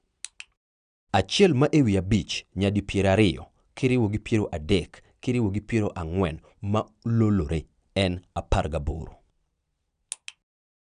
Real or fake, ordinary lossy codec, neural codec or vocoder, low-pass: real; none; none; 9.9 kHz